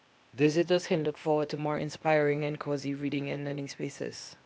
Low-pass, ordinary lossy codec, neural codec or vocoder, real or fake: none; none; codec, 16 kHz, 0.8 kbps, ZipCodec; fake